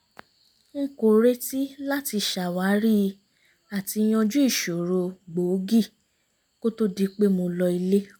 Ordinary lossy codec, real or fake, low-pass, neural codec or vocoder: none; real; none; none